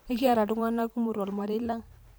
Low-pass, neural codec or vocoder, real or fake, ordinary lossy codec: none; vocoder, 44.1 kHz, 128 mel bands every 512 samples, BigVGAN v2; fake; none